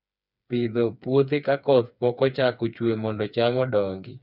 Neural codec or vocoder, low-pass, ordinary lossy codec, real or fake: codec, 16 kHz, 4 kbps, FreqCodec, smaller model; 5.4 kHz; none; fake